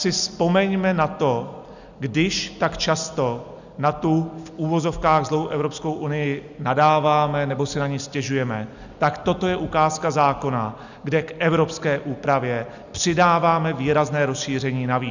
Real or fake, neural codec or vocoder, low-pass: real; none; 7.2 kHz